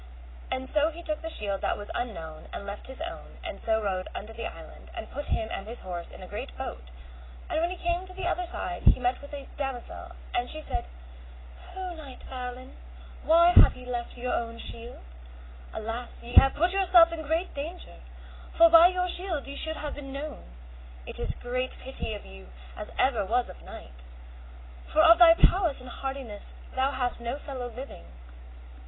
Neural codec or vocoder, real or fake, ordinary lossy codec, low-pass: none; real; AAC, 16 kbps; 7.2 kHz